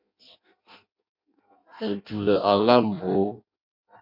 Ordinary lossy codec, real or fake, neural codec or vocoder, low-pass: MP3, 32 kbps; fake; codec, 16 kHz in and 24 kHz out, 0.6 kbps, FireRedTTS-2 codec; 5.4 kHz